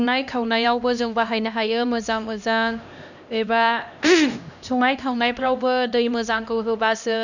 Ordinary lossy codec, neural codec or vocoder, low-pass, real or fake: none; codec, 16 kHz, 1 kbps, X-Codec, HuBERT features, trained on LibriSpeech; 7.2 kHz; fake